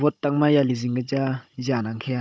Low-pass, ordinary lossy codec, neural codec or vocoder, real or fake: none; none; codec, 16 kHz, 16 kbps, FunCodec, trained on Chinese and English, 50 frames a second; fake